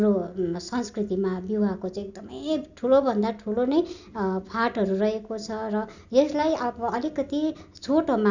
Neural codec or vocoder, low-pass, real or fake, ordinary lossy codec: none; 7.2 kHz; real; none